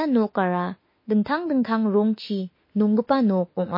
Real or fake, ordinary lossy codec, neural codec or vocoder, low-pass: fake; MP3, 24 kbps; autoencoder, 48 kHz, 32 numbers a frame, DAC-VAE, trained on Japanese speech; 5.4 kHz